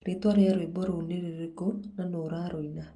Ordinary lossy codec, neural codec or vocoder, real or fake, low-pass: Opus, 32 kbps; none; real; 10.8 kHz